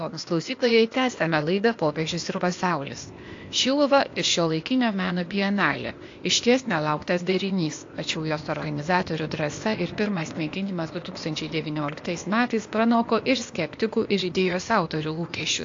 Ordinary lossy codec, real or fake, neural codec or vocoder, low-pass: AAC, 48 kbps; fake; codec, 16 kHz, 0.8 kbps, ZipCodec; 7.2 kHz